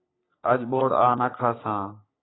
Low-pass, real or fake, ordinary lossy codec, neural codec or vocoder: 7.2 kHz; fake; AAC, 16 kbps; codec, 44.1 kHz, 3.4 kbps, Pupu-Codec